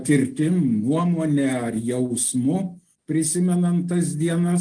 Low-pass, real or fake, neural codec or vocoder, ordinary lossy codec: 9.9 kHz; real; none; Opus, 24 kbps